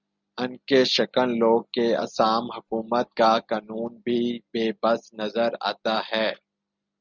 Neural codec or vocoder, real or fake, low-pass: none; real; 7.2 kHz